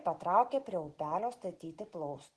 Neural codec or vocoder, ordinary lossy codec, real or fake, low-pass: none; Opus, 16 kbps; real; 10.8 kHz